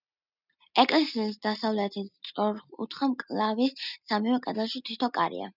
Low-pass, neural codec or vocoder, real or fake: 5.4 kHz; none; real